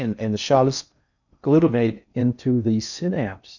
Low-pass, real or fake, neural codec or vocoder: 7.2 kHz; fake; codec, 16 kHz in and 24 kHz out, 0.6 kbps, FocalCodec, streaming, 4096 codes